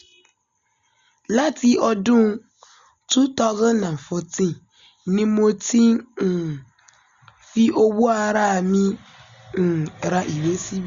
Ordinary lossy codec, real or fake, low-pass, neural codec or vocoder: Opus, 64 kbps; real; 7.2 kHz; none